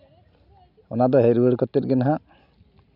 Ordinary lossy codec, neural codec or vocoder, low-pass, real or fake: none; none; 5.4 kHz; real